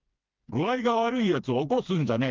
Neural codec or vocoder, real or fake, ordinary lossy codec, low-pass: codec, 16 kHz, 2 kbps, FreqCodec, smaller model; fake; Opus, 24 kbps; 7.2 kHz